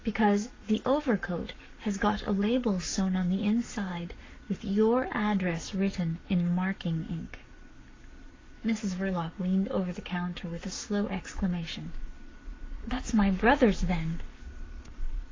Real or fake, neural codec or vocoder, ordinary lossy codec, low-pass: fake; codec, 44.1 kHz, 7.8 kbps, Pupu-Codec; AAC, 32 kbps; 7.2 kHz